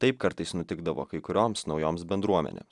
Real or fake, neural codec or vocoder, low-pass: real; none; 10.8 kHz